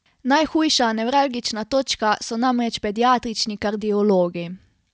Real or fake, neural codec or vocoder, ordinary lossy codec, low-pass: real; none; none; none